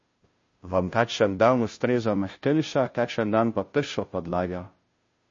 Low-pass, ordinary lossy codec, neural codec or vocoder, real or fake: 7.2 kHz; MP3, 32 kbps; codec, 16 kHz, 0.5 kbps, FunCodec, trained on Chinese and English, 25 frames a second; fake